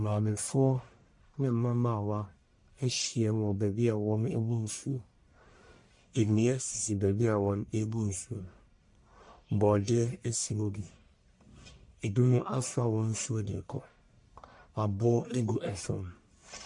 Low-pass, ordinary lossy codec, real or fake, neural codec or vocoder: 10.8 kHz; MP3, 48 kbps; fake; codec, 44.1 kHz, 1.7 kbps, Pupu-Codec